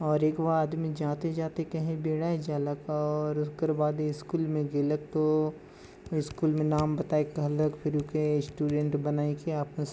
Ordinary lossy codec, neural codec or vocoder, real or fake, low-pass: none; none; real; none